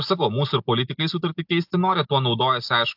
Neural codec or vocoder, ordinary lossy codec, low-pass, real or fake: none; AAC, 48 kbps; 5.4 kHz; real